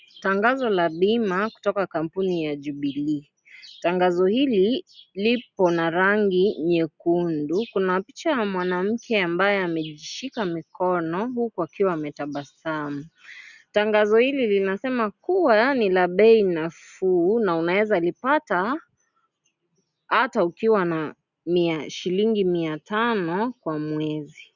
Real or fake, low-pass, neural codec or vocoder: real; 7.2 kHz; none